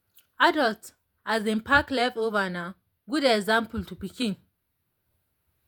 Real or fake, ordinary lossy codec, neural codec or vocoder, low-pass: fake; none; vocoder, 48 kHz, 128 mel bands, Vocos; 19.8 kHz